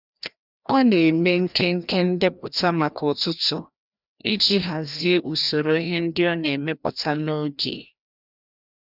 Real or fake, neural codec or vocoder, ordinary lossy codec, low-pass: fake; codec, 16 kHz, 1 kbps, FreqCodec, larger model; none; 5.4 kHz